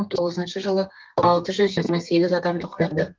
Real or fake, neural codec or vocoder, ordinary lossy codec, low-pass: fake; codec, 32 kHz, 1.9 kbps, SNAC; Opus, 32 kbps; 7.2 kHz